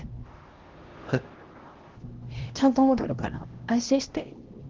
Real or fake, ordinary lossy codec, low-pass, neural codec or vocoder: fake; Opus, 16 kbps; 7.2 kHz; codec, 16 kHz, 1 kbps, X-Codec, HuBERT features, trained on LibriSpeech